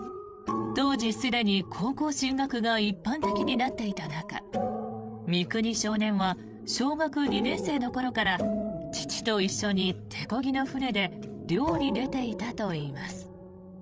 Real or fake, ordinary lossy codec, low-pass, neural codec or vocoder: fake; none; none; codec, 16 kHz, 8 kbps, FreqCodec, larger model